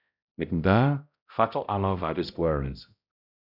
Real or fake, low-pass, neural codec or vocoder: fake; 5.4 kHz; codec, 16 kHz, 0.5 kbps, X-Codec, HuBERT features, trained on balanced general audio